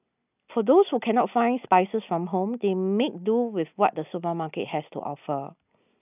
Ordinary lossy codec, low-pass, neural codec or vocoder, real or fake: none; 3.6 kHz; none; real